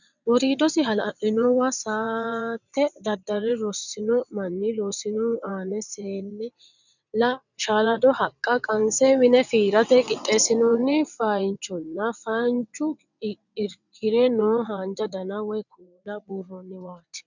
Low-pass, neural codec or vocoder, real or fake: 7.2 kHz; vocoder, 22.05 kHz, 80 mel bands, WaveNeXt; fake